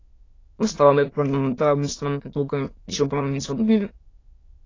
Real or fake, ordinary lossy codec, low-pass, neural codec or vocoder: fake; AAC, 32 kbps; 7.2 kHz; autoencoder, 22.05 kHz, a latent of 192 numbers a frame, VITS, trained on many speakers